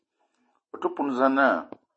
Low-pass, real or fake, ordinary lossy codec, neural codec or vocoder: 9.9 kHz; real; MP3, 32 kbps; none